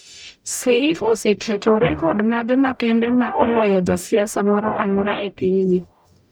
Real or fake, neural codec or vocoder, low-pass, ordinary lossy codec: fake; codec, 44.1 kHz, 0.9 kbps, DAC; none; none